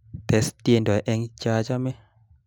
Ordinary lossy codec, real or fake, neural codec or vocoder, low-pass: none; real; none; 19.8 kHz